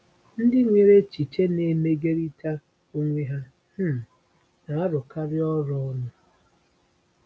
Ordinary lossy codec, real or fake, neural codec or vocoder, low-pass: none; real; none; none